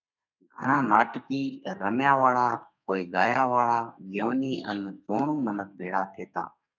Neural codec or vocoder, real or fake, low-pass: codec, 32 kHz, 1.9 kbps, SNAC; fake; 7.2 kHz